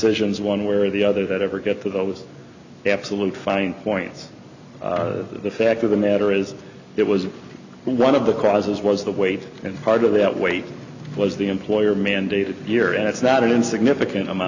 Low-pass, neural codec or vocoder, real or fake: 7.2 kHz; none; real